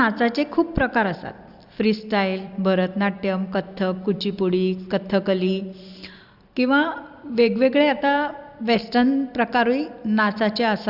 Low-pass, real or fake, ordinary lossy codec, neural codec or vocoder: 5.4 kHz; real; Opus, 64 kbps; none